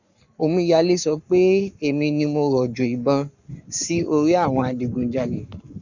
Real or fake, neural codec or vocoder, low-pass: fake; codec, 44.1 kHz, 7.8 kbps, Pupu-Codec; 7.2 kHz